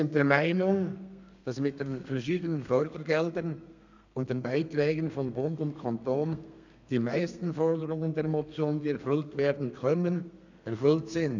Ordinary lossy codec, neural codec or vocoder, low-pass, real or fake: none; codec, 32 kHz, 1.9 kbps, SNAC; 7.2 kHz; fake